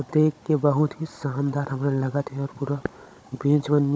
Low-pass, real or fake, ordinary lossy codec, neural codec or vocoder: none; fake; none; codec, 16 kHz, 16 kbps, FunCodec, trained on Chinese and English, 50 frames a second